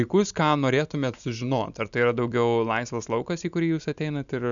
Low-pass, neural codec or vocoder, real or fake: 7.2 kHz; none; real